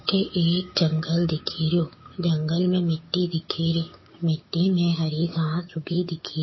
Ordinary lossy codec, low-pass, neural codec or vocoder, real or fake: MP3, 24 kbps; 7.2 kHz; codec, 16 kHz, 6 kbps, DAC; fake